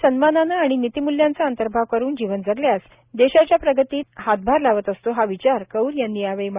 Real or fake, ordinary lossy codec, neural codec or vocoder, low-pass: real; Opus, 64 kbps; none; 3.6 kHz